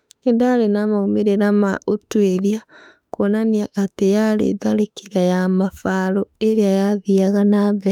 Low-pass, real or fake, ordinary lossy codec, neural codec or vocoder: 19.8 kHz; fake; none; autoencoder, 48 kHz, 32 numbers a frame, DAC-VAE, trained on Japanese speech